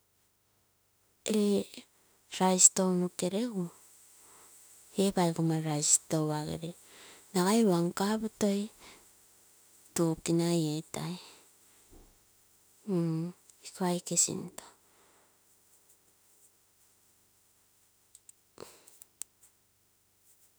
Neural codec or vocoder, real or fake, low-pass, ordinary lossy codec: autoencoder, 48 kHz, 32 numbers a frame, DAC-VAE, trained on Japanese speech; fake; none; none